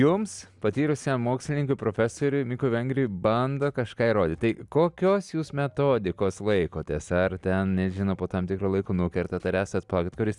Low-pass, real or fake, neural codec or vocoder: 10.8 kHz; real; none